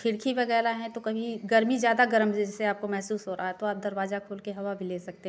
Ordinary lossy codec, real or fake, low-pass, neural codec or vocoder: none; real; none; none